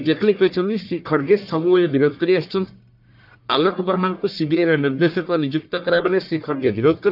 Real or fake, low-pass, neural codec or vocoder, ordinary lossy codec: fake; 5.4 kHz; codec, 44.1 kHz, 1.7 kbps, Pupu-Codec; none